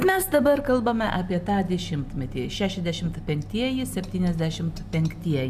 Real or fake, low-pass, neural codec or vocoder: real; 14.4 kHz; none